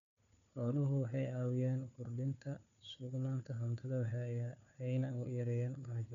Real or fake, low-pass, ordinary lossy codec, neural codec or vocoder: fake; 7.2 kHz; none; codec, 16 kHz, 4 kbps, FunCodec, trained on Chinese and English, 50 frames a second